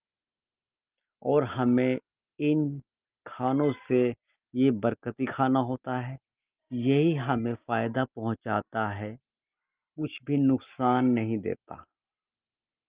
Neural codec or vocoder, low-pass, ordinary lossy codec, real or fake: none; 3.6 kHz; Opus, 32 kbps; real